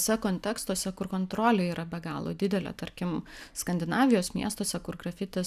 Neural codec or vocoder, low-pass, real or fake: none; 14.4 kHz; real